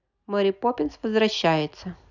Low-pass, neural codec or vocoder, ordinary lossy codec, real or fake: 7.2 kHz; none; none; real